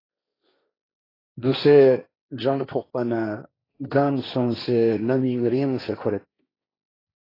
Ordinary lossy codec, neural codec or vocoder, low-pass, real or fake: AAC, 24 kbps; codec, 16 kHz, 1.1 kbps, Voila-Tokenizer; 5.4 kHz; fake